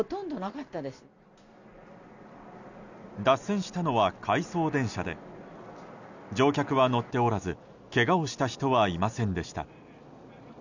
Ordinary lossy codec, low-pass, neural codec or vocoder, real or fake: none; 7.2 kHz; none; real